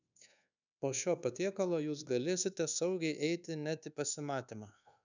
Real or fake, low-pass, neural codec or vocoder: fake; 7.2 kHz; codec, 24 kHz, 1.2 kbps, DualCodec